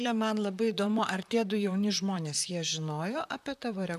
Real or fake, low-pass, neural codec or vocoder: fake; 14.4 kHz; vocoder, 44.1 kHz, 128 mel bands, Pupu-Vocoder